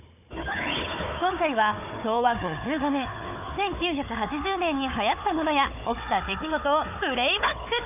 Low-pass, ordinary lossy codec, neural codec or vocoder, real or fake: 3.6 kHz; none; codec, 16 kHz, 4 kbps, FunCodec, trained on Chinese and English, 50 frames a second; fake